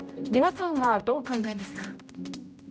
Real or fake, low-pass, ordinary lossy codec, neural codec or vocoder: fake; none; none; codec, 16 kHz, 0.5 kbps, X-Codec, HuBERT features, trained on general audio